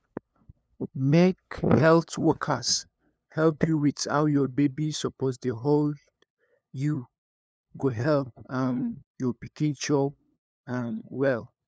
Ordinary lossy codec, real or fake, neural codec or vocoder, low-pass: none; fake; codec, 16 kHz, 2 kbps, FunCodec, trained on LibriTTS, 25 frames a second; none